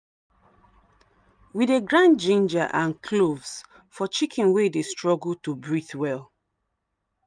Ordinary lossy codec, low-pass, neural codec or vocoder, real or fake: none; 9.9 kHz; none; real